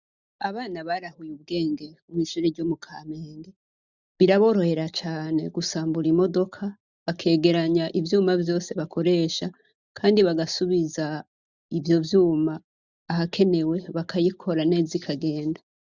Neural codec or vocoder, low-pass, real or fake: none; 7.2 kHz; real